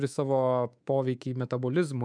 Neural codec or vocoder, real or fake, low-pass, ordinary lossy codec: codec, 24 kHz, 3.1 kbps, DualCodec; fake; 9.9 kHz; MP3, 96 kbps